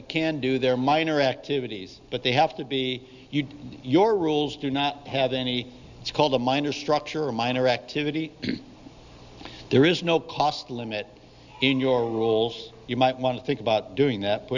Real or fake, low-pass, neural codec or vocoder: real; 7.2 kHz; none